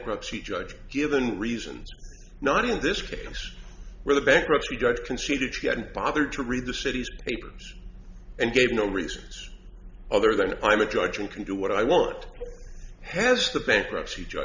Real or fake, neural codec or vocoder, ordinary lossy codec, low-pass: real; none; Opus, 64 kbps; 7.2 kHz